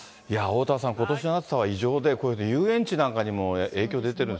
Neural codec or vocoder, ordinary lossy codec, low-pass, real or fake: none; none; none; real